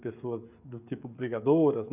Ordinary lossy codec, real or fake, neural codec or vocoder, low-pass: none; fake; codec, 16 kHz, 16 kbps, FreqCodec, smaller model; 3.6 kHz